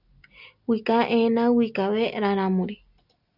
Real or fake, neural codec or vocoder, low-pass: real; none; 5.4 kHz